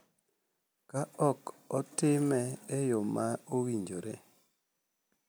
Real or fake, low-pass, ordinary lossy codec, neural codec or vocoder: real; none; none; none